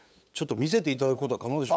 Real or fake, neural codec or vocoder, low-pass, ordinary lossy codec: fake; codec, 16 kHz, 8 kbps, FunCodec, trained on LibriTTS, 25 frames a second; none; none